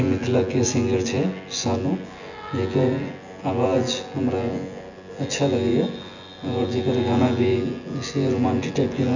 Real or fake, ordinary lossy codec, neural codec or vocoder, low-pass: fake; none; vocoder, 24 kHz, 100 mel bands, Vocos; 7.2 kHz